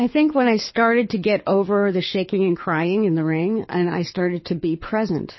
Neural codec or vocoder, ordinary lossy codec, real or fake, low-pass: codec, 24 kHz, 6 kbps, HILCodec; MP3, 24 kbps; fake; 7.2 kHz